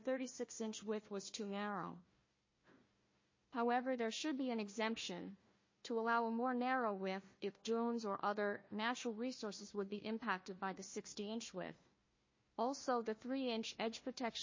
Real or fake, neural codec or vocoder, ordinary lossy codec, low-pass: fake; codec, 16 kHz, 1 kbps, FunCodec, trained on Chinese and English, 50 frames a second; MP3, 32 kbps; 7.2 kHz